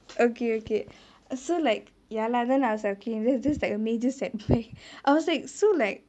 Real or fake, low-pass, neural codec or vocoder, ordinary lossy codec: real; none; none; none